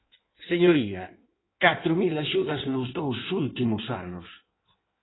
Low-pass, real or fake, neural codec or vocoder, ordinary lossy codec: 7.2 kHz; fake; codec, 16 kHz in and 24 kHz out, 1.1 kbps, FireRedTTS-2 codec; AAC, 16 kbps